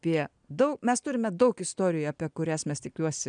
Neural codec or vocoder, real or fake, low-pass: none; real; 9.9 kHz